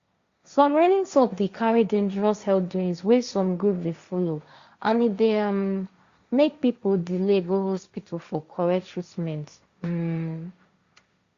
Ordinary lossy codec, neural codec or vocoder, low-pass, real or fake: Opus, 64 kbps; codec, 16 kHz, 1.1 kbps, Voila-Tokenizer; 7.2 kHz; fake